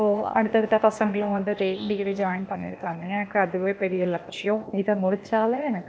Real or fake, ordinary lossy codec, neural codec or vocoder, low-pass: fake; none; codec, 16 kHz, 0.8 kbps, ZipCodec; none